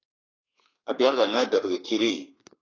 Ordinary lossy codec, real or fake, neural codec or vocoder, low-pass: AAC, 32 kbps; fake; codec, 32 kHz, 1.9 kbps, SNAC; 7.2 kHz